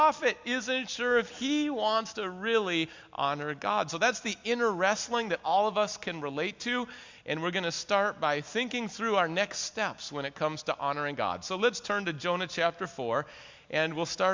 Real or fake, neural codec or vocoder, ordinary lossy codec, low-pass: real; none; MP3, 64 kbps; 7.2 kHz